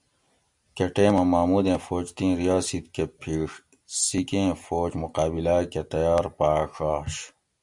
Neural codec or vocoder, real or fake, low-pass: none; real; 10.8 kHz